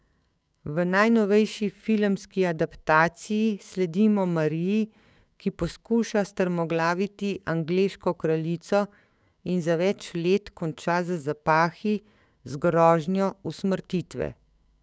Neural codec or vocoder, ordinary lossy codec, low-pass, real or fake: codec, 16 kHz, 6 kbps, DAC; none; none; fake